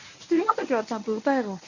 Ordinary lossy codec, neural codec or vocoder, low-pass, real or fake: none; codec, 24 kHz, 0.9 kbps, WavTokenizer, medium speech release version 1; 7.2 kHz; fake